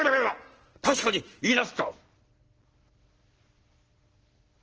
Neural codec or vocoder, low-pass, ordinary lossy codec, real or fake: none; 7.2 kHz; Opus, 16 kbps; real